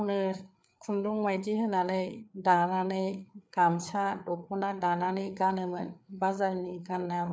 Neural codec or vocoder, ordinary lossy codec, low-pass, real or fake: codec, 16 kHz, 4 kbps, FreqCodec, larger model; none; none; fake